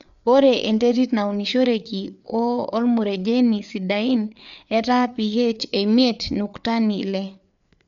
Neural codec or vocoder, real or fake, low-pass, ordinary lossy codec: codec, 16 kHz, 4 kbps, FreqCodec, larger model; fake; 7.2 kHz; none